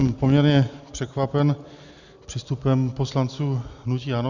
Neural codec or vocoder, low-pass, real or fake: none; 7.2 kHz; real